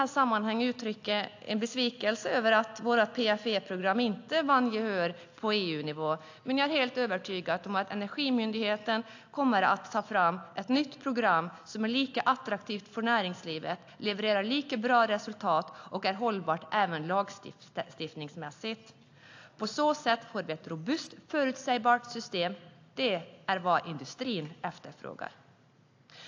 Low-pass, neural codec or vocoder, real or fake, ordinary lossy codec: 7.2 kHz; none; real; AAC, 48 kbps